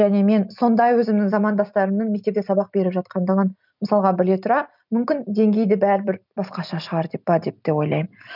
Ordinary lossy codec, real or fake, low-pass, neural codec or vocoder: none; real; 5.4 kHz; none